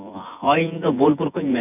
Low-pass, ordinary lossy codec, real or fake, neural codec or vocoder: 3.6 kHz; none; fake; vocoder, 24 kHz, 100 mel bands, Vocos